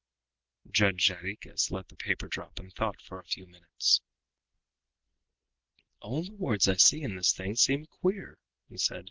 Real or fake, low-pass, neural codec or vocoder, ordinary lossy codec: real; 7.2 kHz; none; Opus, 16 kbps